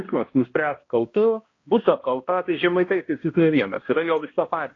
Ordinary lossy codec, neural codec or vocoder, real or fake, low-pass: AAC, 32 kbps; codec, 16 kHz, 1 kbps, X-Codec, HuBERT features, trained on balanced general audio; fake; 7.2 kHz